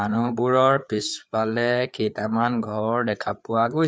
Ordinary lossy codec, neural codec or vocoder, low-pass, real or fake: none; codec, 16 kHz, 4 kbps, FreqCodec, larger model; none; fake